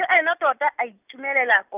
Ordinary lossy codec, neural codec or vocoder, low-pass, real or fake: none; none; 3.6 kHz; real